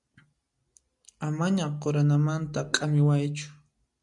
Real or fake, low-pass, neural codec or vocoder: real; 10.8 kHz; none